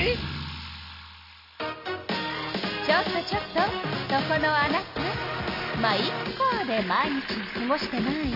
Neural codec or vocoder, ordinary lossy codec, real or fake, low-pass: none; none; real; 5.4 kHz